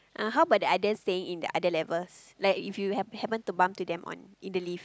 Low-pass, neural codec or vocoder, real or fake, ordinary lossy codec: none; none; real; none